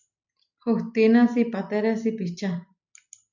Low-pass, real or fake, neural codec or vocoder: 7.2 kHz; real; none